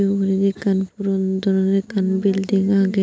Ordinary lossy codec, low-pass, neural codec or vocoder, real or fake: none; none; none; real